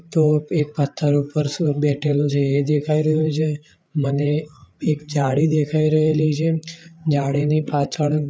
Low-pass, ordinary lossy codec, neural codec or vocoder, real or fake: none; none; codec, 16 kHz, 8 kbps, FreqCodec, larger model; fake